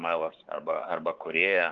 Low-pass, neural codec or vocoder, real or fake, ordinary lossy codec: 7.2 kHz; codec, 16 kHz, 4 kbps, X-Codec, WavLM features, trained on Multilingual LibriSpeech; fake; Opus, 24 kbps